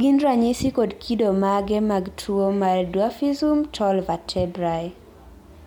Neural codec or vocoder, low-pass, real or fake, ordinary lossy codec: none; 19.8 kHz; real; MP3, 96 kbps